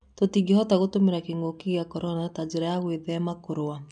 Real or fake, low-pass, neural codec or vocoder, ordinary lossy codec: real; 10.8 kHz; none; none